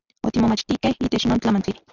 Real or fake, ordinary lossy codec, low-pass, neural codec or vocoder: real; Opus, 64 kbps; 7.2 kHz; none